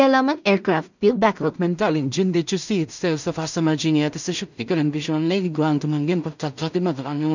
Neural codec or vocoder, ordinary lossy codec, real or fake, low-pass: codec, 16 kHz in and 24 kHz out, 0.4 kbps, LongCat-Audio-Codec, two codebook decoder; none; fake; 7.2 kHz